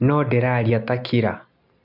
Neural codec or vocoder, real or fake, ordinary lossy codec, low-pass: none; real; MP3, 48 kbps; 5.4 kHz